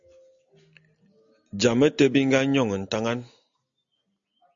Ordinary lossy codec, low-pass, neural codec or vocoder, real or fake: AAC, 64 kbps; 7.2 kHz; none; real